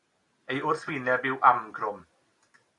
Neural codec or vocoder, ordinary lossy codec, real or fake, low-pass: none; AAC, 48 kbps; real; 10.8 kHz